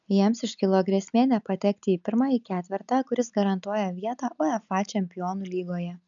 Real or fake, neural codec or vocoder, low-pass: real; none; 7.2 kHz